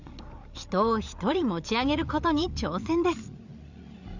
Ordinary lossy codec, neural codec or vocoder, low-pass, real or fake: none; codec, 16 kHz, 8 kbps, FreqCodec, larger model; 7.2 kHz; fake